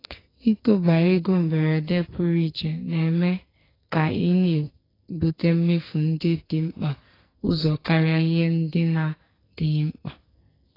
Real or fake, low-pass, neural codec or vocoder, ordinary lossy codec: fake; 5.4 kHz; codec, 44.1 kHz, 2.6 kbps, SNAC; AAC, 24 kbps